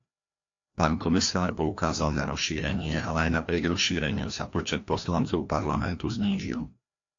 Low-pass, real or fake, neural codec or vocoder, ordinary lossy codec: 7.2 kHz; fake; codec, 16 kHz, 1 kbps, FreqCodec, larger model; AAC, 48 kbps